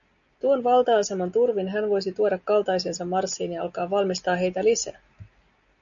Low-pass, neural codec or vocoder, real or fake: 7.2 kHz; none; real